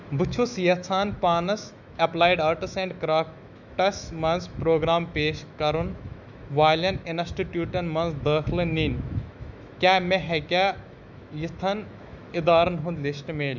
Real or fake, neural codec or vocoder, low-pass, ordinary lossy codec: fake; autoencoder, 48 kHz, 128 numbers a frame, DAC-VAE, trained on Japanese speech; 7.2 kHz; none